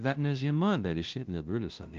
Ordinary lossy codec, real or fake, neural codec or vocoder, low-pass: Opus, 24 kbps; fake; codec, 16 kHz, 0.5 kbps, FunCodec, trained on LibriTTS, 25 frames a second; 7.2 kHz